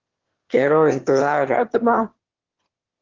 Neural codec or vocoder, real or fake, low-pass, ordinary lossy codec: autoencoder, 22.05 kHz, a latent of 192 numbers a frame, VITS, trained on one speaker; fake; 7.2 kHz; Opus, 16 kbps